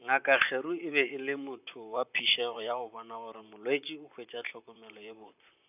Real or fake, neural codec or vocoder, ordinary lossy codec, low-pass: fake; vocoder, 44.1 kHz, 128 mel bands, Pupu-Vocoder; none; 3.6 kHz